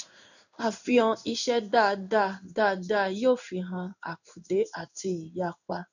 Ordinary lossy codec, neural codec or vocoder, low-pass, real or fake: none; codec, 16 kHz in and 24 kHz out, 1 kbps, XY-Tokenizer; 7.2 kHz; fake